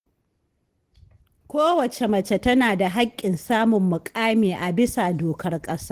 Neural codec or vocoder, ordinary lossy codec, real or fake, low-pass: none; Opus, 16 kbps; real; 14.4 kHz